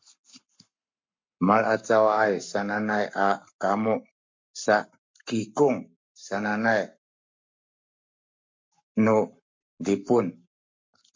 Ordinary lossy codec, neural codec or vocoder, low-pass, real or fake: MP3, 48 kbps; codec, 44.1 kHz, 7.8 kbps, Pupu-Codec; 7.2 kHz; fake